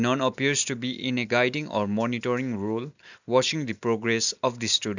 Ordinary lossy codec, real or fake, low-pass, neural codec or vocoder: none; real; 7.2 kHz; none